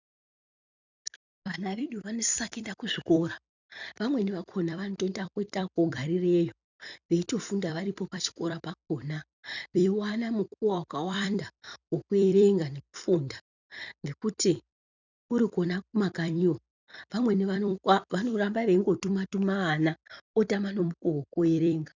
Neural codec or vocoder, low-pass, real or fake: vocoder, 44.1 kHz, 128 mel bands every 256 samples, BigVGAN v2; 7.2 kHz; fake